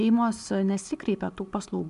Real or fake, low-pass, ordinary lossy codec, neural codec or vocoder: real; 10.8 kHz; AAC, 96 kbps; none